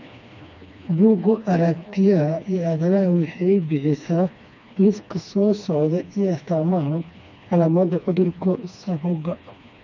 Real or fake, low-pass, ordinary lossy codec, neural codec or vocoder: fake; 7.2 kHz; none; codec, 16 kHz, 2 kbps, FreqCodec, smaller model